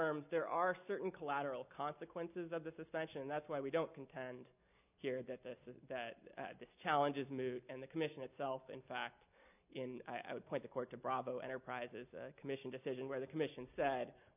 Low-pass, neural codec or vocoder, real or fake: 3.6 kHz; none; real